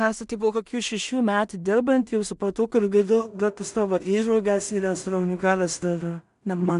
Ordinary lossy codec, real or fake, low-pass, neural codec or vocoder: MP3, 96 kbps; fake; 10.8 kHz; codec, 16 kHz in and 24 kHz out, 0.4 kbps, LongCat-Audio-Codec, two codebook decoder